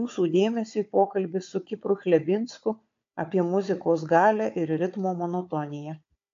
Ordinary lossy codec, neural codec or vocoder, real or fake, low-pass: AAC, 48 kbps; codec, 16 kHz, 4 kbps, FunCodec, trained on Chinese and English, 50 frames a second; fake; 7.2 kHz